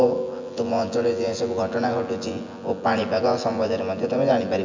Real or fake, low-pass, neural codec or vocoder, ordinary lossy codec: fake; 7.2 kHz; vocoder, 24 kHz, 100 mel bands, Vocos; MP3, 64 kbps